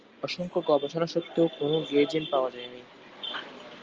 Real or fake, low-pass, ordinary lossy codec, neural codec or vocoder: real; 7.2 kHz; Opus, 24 kbps; none